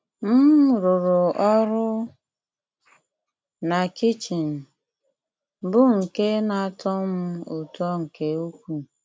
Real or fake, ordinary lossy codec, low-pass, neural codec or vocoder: real; none; none; none